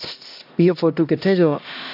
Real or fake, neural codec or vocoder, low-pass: fake; codec, 16 kHz, 1 kbps, X-Codec, HuBERT features, trained on LibriSpeech; 5.4 kHz